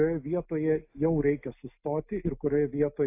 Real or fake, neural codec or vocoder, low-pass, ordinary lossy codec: real; none; 3.6 kHz; AAC, 32 kbps